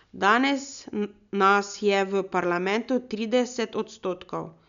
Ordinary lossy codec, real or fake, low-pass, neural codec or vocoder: none; real; 7.2 kHz; none